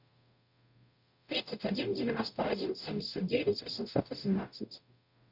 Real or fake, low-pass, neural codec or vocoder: fake; 5.4 kHz; codec, 44.1 kHz, 0.9 kbps, DAC